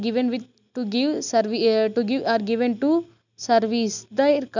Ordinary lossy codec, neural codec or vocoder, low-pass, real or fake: none; none; 7.2 kHz; real